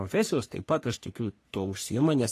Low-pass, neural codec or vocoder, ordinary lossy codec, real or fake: 14.4 kHz; codec, 44.1 kHz, 3.4 kbps, Pupu-Codec; AAC, 48 kbps; fake